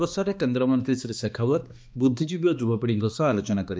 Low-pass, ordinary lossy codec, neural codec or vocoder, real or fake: none; none; codec, 16 kHz, 2 kbps, X-Codec, HuBERT features, trained on balanced general audio; fake